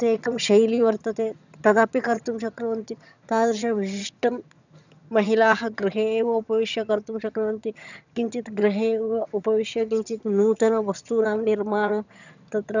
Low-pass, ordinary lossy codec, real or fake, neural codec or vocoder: 7.2 kHz; none; fake; vocoder, 22.05 kHz, 80 mel bands, HiFi-GAN